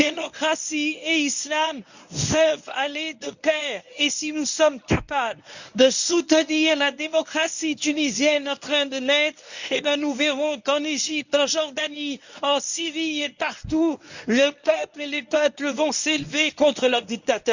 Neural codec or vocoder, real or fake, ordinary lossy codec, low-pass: codec, 24 kHz, 0.9 kbps, WavTokenizer, medium speech release version 1; fake; none; 7.2 kHz